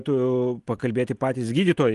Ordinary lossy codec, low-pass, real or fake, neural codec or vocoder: Opus, 24 kbps; 10.8 kHz; real; none